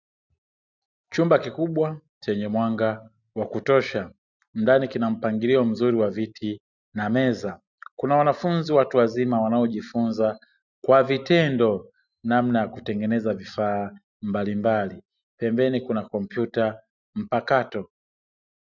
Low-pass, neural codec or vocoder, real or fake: 7.2 kHz; none; real